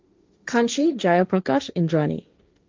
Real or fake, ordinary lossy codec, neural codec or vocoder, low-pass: fake; Opus, 32 kbps; codec, 16 kHz, 1.1 kbps, Voila-Tokenizer; 7.2 kHz